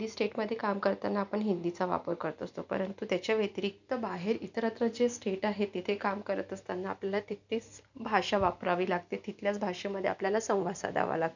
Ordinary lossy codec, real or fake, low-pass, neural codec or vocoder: none; real; 7.2 kHz; none